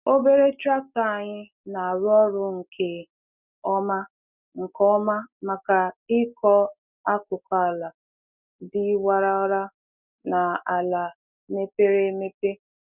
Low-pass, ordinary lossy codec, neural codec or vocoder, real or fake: 3.6 kHz; none; none; real